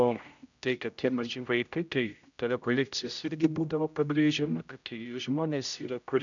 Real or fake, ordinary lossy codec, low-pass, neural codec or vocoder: fake; MP3, 96 kbps; 7.2 kHz; codec, 16 kHz, 0.5 kbps, X-Codec, HuBERT features, trained on general audio